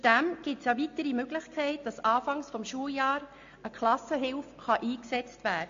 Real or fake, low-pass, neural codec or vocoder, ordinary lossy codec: real; 7.2 kHz; none; AAC, 48 kbps